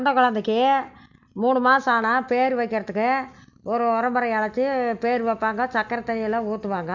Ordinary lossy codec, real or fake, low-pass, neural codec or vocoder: none; real; 7.2 kHz; none